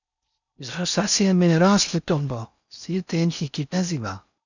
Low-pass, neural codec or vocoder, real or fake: 7.2 kHz; codec, 16 kHz in and 24 kHz out, 0.6 kbps, FocalCodec, streaming, 4096 codes; fake